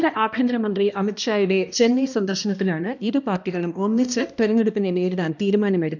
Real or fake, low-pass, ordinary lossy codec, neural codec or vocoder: fake; 7.2 kHz; none; codec, 16 kHz, 1 kbps, X-Codec, HuBERT features, trained on balanced general audio